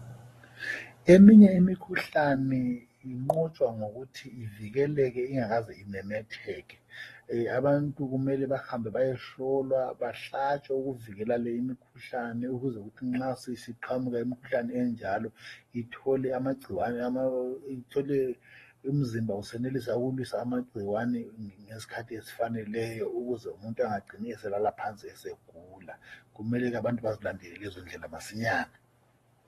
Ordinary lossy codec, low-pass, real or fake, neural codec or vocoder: AAC, 32 kbps; 19.8 kHz; fake; codec, 44.1 kHz, 7.8 kbps, Pupu-Codec